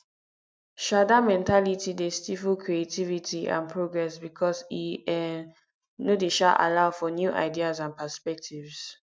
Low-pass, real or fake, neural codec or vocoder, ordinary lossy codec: none; real; none; none